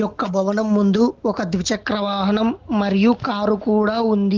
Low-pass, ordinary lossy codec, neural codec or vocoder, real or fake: 7.2 kHz; Opus, 16 kbps; none; real